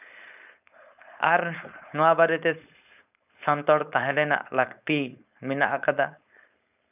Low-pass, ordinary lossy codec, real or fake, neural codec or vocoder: 3.6 kHz; none; fake; codec, 16 kHz, 4.8 kbps, FACodec